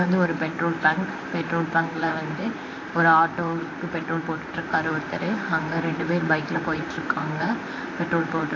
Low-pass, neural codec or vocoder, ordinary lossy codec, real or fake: 7.2 kHz; vocoder, 44.1 kHz, 128 mel bands, Pupu-Vocoder; AAC, 32 kbps; fake